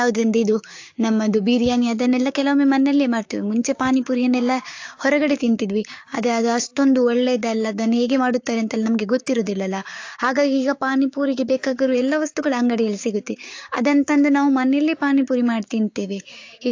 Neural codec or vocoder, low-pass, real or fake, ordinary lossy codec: codec, 16 kHz, 6 kbps, DAC; 7.2 kHz; fake; AAC, 48 kbps